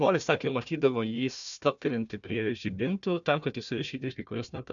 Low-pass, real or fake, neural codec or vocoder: 7.2 kHz; fake; codec, 16 kHz, 1 kbps, FunCodec, trained on Chinese and English, 50 frames a second